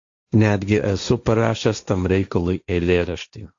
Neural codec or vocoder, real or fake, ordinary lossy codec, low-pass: codec, 16 kHz, 1.1 kbps, Voila-Tokenizer; fake; AAC, 64 kbps; 7.2 kHz